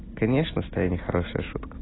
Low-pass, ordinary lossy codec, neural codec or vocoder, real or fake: 7.2 kHz; AAC, 16 kbps; none; real